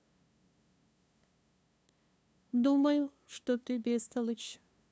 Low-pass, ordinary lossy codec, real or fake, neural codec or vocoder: none; none; fake; codec, 16 kHz, 2 kbps, FunCodec, trained on LibriTTS, 25 frames a second